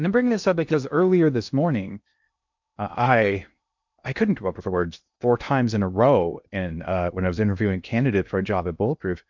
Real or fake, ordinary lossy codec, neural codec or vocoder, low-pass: fake; MP3, 64 kbps; codec, 16 kHz in and 24 kHz out, 0.6 kbps, FocalCodec, streaming, 2048 codes; 7.2 kHz